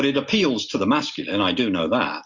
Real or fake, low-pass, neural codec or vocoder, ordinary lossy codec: real; 7.2 kHz; none; MP3, 64 kbps